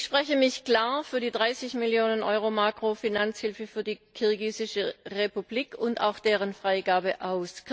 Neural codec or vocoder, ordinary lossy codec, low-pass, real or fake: none; none; none; real